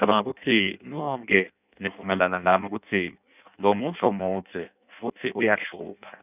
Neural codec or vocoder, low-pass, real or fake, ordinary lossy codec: codec, 16 kHz in and 24 kHz out, 0.6 kbps, FireRedTTS-2 codec; 3.6 kHz; fake; none